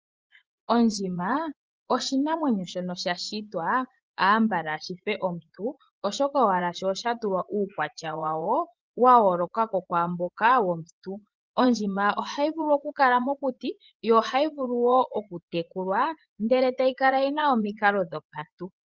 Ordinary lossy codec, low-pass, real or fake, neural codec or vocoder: Opus, 24 kbps; 7.2 kHz; fake; vocoder, 24 kHz, 100 mel bands, Vocos